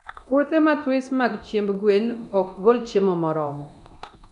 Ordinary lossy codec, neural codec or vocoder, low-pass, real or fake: none; codec, 24 kHz, 0.9 kbps, DualCodec; 10.8 kHz; fake